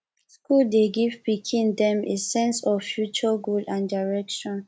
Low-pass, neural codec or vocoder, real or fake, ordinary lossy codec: none; none; real; none